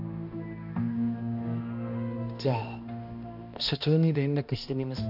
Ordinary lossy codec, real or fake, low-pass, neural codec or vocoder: none; fake; 5.4 kHz; codec, 16 kHz, 1 kbps, X-Codec, HuBERT features, trained on balanced general audio